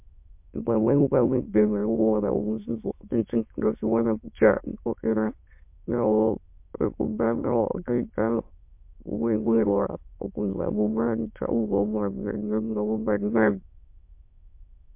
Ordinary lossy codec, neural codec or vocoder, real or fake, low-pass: MP3, 32 kbps; autoencoder, 22.05 kHz, a latent of 192 numbers a frame, VITS, trained on many speakers; fake; 3.6 kHz